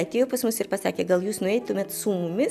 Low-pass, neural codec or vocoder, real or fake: 14.4 kHz; none; real